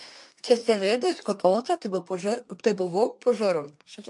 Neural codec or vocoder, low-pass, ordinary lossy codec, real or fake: codec, 24 kHz, 1 kbps, SNAC; 10.8 kHz; MP3, 64 kbps; fake